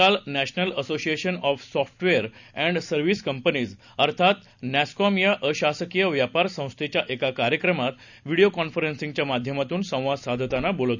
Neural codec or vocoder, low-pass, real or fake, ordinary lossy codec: none; 7.2 kHz; real; none